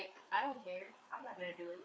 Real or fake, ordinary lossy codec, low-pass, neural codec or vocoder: fake; none; none; codec, 16 kHz, 4 kbps, FreqCodec, larger model